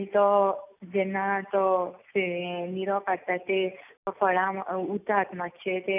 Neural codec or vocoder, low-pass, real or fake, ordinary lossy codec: none; 3.6 kHz; real; none